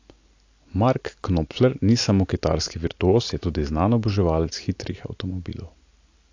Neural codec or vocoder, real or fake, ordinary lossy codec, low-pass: none; real; AAC, 48 kbps; 7.2 kHz